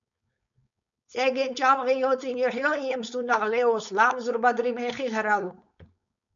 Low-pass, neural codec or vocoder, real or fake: 7.2 kHz; codec, 16 kHz, 4.8 kbps, FACodec; fake